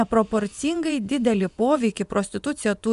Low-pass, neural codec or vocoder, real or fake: 10.8 kHz; vocoder, 24 kHz, 100 mel bands, Vocos; fake